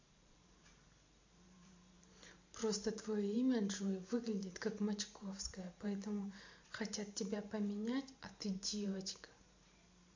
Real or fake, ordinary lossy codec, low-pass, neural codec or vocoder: fake; MP3, 48 kbps; 7.2 kHz; vocoder, 44.1 kHz, 128 mel bands every 512 samples, BigVGAN v2